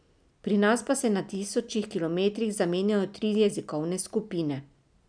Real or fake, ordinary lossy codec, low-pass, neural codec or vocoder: real; none; 9.9 kHz; none